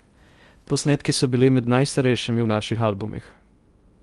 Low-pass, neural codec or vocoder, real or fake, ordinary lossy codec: 10.8 kHz; codec, 16 kHz in and 24 kHz out, 0.6 kbps, FocalCodec, streaming, 2048 codes; fake; Opus, 32 kbps